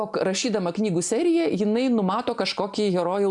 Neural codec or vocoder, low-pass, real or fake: none; 10.8 kHz; real